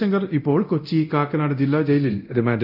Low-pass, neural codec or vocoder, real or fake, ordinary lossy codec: 5.4 kHz; codec, 24 kHz, 0.9 kbps, DualCodec; fake; none